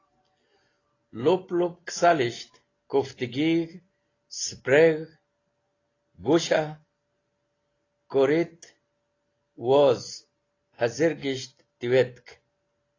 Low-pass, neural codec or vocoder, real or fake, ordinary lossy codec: 7.2 kHz; none; real; AAC, 32 kbps